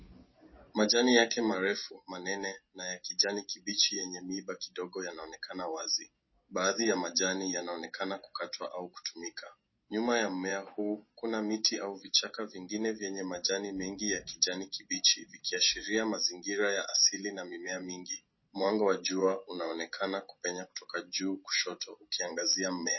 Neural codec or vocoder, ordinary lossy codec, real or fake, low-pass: none; MP3, 24 kbps; real; 7.2 kHz